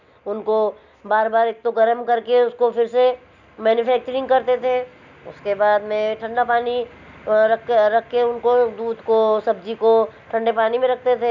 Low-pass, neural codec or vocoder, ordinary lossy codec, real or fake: 7.2 kHz; none; none; real